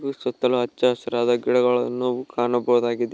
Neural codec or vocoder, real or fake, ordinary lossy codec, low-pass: none; real; none; none